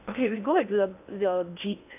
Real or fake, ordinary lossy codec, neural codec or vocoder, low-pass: fake; none; codec, 16 kHz in and 24 kHz out, 0.8 kbps, FocalCodec, streaming, 65536 codes; 3.6 kHz